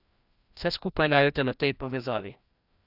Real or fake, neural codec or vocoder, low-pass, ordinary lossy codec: fake; codec, 16 kHz, 1 kbps, FreqCodec, larger model; 5.4 kHz; Opus, 64 kbps